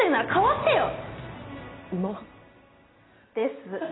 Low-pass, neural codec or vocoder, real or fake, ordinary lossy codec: 7.2 kHz; none; real; AAC, 16 kbps